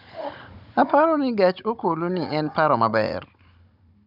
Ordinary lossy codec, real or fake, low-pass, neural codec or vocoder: none; fake; 5.4 kHz; codec, 16 kHz, 16 kbps, FunCodec, trained on Chinese and English, 50 frames a second